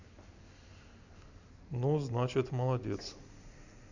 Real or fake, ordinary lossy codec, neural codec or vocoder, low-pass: real; Opus, 64 kbps; none; 7.2 kHz